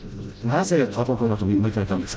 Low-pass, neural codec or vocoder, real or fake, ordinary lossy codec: none; codec, 16 kHz, 0.5 kbps, FreqCodec, smaller model; fake; none